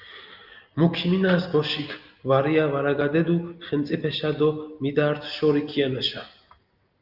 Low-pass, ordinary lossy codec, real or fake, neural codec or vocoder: 5.4 kHz; Opus, 24 kbps; real; none